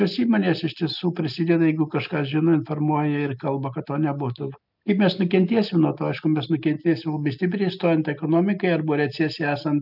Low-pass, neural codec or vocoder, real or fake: 5.4 kHz; none; real